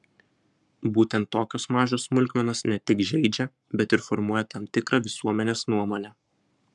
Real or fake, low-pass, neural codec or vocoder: fake; 10.8 kHz; codec, 44.1 kHz, 7.8 kbps, Pupu-Codec